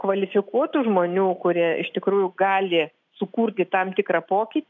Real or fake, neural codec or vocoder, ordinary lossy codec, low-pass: real; none; MP3, 64 kbps; 7.2 kHz